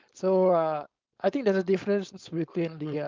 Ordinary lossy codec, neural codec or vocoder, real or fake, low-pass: Opus, 24 kbps; codec, 16 kHz, 4.8 kbps, FACodec; fake; 7.2 kHz